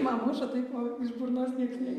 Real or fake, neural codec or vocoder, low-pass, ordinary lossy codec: real; none; 14.4 kHz; Opus, 64 kbps